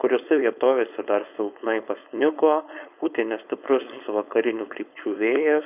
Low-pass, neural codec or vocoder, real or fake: 3.6 kHz; codec, 16 kHz, 4.8 kbps, FACodec; fake